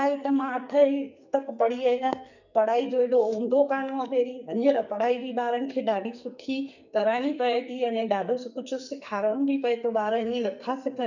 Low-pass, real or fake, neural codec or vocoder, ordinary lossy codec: 7.2 kHz; fake; codec, 44.1 kHz, 2.6 kbps, SNAC; none